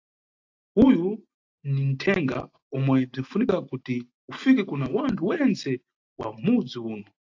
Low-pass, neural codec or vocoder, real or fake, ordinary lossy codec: 7.2 kHz; none; real; AAC, 48 kbps